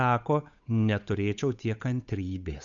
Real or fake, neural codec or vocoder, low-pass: fake; codec, 16 kHz, 8 kbps, FunCodec, trained on Chinese and English, 25 frames a second; 7.2 kHz